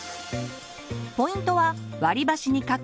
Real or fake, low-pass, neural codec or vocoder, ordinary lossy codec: real; none; none; none